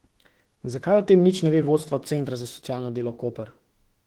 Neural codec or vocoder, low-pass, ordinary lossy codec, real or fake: autoencoder, 48 kHz, 32 numbers a frame, DAC-VAE, trained on Japanese speech; 19.8 kHz; Opus, 16 kbps; fake